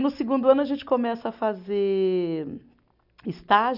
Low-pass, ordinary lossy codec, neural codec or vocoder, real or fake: 5.4 kHz; none; none; real